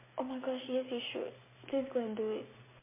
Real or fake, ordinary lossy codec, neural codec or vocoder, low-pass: real; MP3, 16 kbps; none; 3.6 kHz